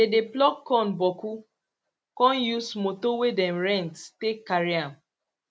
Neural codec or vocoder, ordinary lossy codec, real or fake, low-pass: none; none; real; none